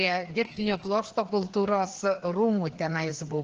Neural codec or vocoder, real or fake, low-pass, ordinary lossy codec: codec, 16 kHz, 2 kbps, FreqCodec, larger model; fake; 7.2 kHz; Opus, 16 kbps